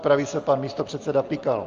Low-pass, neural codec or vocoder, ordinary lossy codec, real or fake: 7.2 kHz; none; Opus, 32 kbps; real